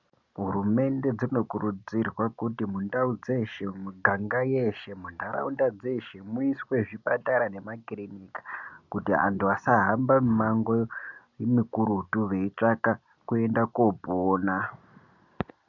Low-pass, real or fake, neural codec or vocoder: 7.2 kHz; real; none